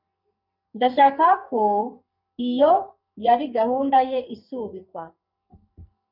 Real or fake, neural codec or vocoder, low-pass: fake; codec, 44.1 kHz, 2.6 kbps, SNAC; 5.4 kHz